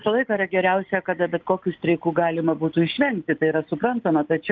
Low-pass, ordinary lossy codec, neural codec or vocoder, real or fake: 7.2 kHz; Opus, 24 kbps; none; real